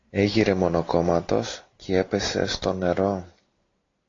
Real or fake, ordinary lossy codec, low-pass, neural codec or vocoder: real; AAC, 32 kbps; 7.2 kHz; none